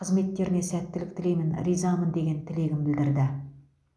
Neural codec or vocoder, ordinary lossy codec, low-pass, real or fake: none; none; none; real